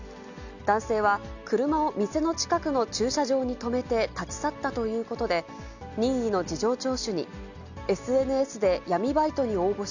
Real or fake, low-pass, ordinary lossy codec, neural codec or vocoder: real; 7.2 kHz; none; none